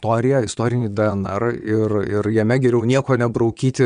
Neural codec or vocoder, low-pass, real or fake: vocoder, 22.05 kHz, 80 mel bands, WaveNeXt; 9.9 kHz; fake